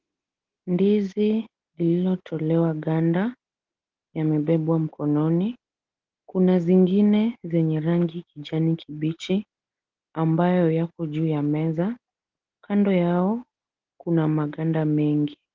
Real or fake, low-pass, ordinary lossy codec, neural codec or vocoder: real; 7.2 kHz; Opus, 16 kbps; none